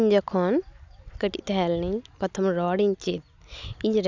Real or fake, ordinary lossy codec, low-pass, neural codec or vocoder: real; none; 7.2 kHz; none